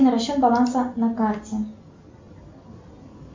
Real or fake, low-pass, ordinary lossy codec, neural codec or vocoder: real; 7.2 kHz; MP3, 48 kbps; none